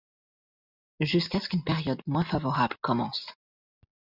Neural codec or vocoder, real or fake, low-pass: none; real; 5.4 kHz